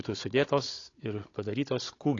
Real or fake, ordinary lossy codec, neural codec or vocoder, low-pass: fake; AAC, 32 kbps; codec, 16 kHz, 16 kbps, FunCodec, trained on Chinese and English, 50 frames a second; 7.2 kHz